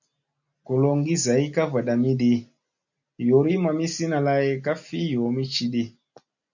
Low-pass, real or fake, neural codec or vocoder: 7.2 kHz; real; none